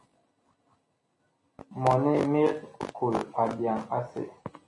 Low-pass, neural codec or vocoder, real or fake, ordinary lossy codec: 10.8 kHz; none; real; MP3, 48 kbps